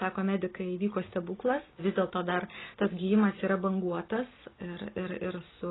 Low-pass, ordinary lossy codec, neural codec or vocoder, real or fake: 7.2 kHz; AAC, 16 kbps; none; real